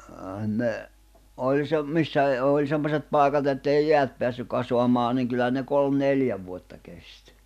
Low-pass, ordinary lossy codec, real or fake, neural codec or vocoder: 14.4 kHz; none; real; none